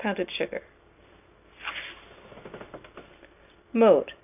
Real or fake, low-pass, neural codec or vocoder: real; 3.6 kHz; none